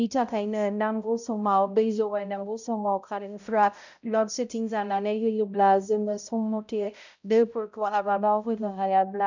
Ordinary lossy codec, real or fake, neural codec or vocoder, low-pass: none; fake; codec, 16 kHz, 0.5 kbps, X-Codec, HuBERT features, trained on balanced general audio; 7.2 kHz